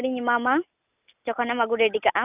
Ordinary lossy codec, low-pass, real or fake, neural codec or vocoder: none; 3.6 kHz; real; none